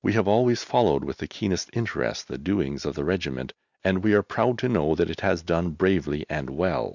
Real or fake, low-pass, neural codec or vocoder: real; 7.2 kHz; none